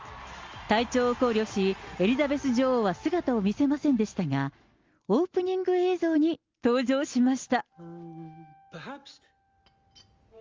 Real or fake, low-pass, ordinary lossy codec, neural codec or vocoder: real; 7.2 kHz; Opus, 32 kbps; none